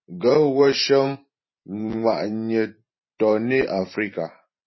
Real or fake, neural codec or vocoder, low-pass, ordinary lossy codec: fake; vocoder, 44.1 kHz, 128 mel bands every 512 samples, BigVGAN v2; 7.2 kHz; MP3, 24 kbps